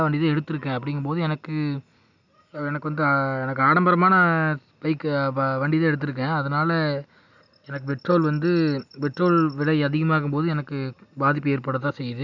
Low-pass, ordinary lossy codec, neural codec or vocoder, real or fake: 7.2 kHz; none; none; real